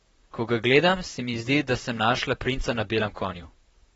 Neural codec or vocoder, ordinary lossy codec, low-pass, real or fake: vocoder, 44.1 kHz, 128 mel bands, Pupu-Vocoder; AAC, 24 kbps; 19.8 kHz; fake